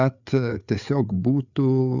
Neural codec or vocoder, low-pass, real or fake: codec, 16 kHz, 4 kbps, FreqCodec, larger model; 7.2 kHz; fake